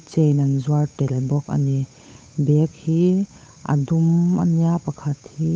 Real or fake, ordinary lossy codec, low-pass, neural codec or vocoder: fake; none; none; codec, 16 kHz, 8 kbps, FunCodec, trained on Chinese and English, 25 frames a second